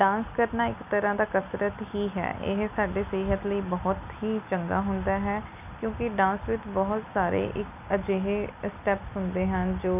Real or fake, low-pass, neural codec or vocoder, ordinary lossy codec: real; 3.6 kHz; none; none